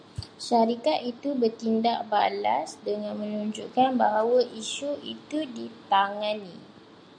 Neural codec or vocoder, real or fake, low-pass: none; real; 9.9 kHz